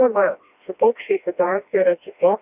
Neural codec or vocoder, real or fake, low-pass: codec, 16 kHz, 1 kbps, FreqCodec, smaller model; fake; 3.6 kHz